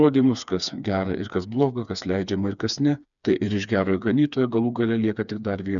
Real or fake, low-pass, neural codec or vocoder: fake; 7.2 kHz; codec, 16 kHz, 4 kbps, FreqCodec, smaller model